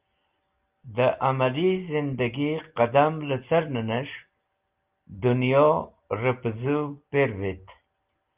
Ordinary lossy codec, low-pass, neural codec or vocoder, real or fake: Opus, 32 kbps; 3.6 kHz; none; real